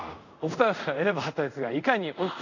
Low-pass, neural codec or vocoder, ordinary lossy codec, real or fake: 7.2 kHz; codec, 24 kHz, 0.5 kbps, DualCodec; none; fake